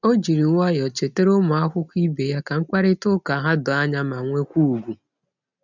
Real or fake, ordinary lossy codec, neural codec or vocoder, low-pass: real; none; none; 7.2 kHz